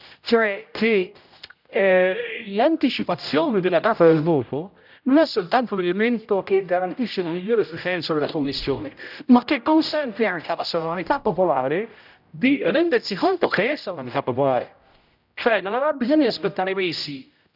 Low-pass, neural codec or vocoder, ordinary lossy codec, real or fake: 5.4 kHz; codec, 16 kHz, 0.5 kbps, X-Codec, HuBERT features, trained on general audio; none; fake